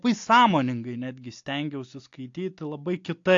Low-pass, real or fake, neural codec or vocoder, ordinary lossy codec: 7.2 kHz; real; none; AAC, 48 kbps